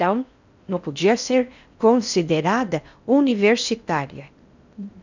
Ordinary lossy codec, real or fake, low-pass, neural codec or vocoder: none; fake; 7.2 kHz; codec, 16 kHz in and 24 kHz out, 0.6 kbps, FocalCodec, streaming, 4096 codes